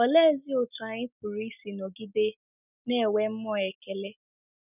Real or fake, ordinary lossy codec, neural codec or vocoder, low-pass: real; none; none; 3.6 kHz